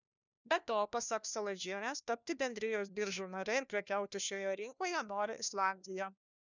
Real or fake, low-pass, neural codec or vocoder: fake; 7.2 kHz; codec, 16 kHz, 1 kbps, FunCodec, trained on LibriTTS, 50 frames a second